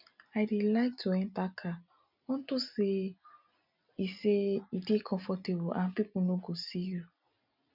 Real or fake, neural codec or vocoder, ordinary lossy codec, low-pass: real; none; none; 5.4 kHz